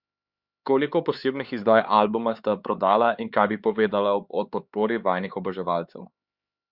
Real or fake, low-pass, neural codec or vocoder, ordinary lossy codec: fake; 5.4 kHz; codec, 16 kHz, 4 kbps, X-Codec, HuBERT features, trained on LibriSpeech; Opus, 64 kbps